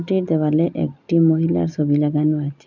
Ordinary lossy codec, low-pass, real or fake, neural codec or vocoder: none; 7.2 kHz; real; none